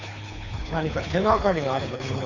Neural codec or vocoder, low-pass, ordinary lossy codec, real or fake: codec, 24 kHz, 3 kbps, HILCodec; 7.2 kHz; none; fake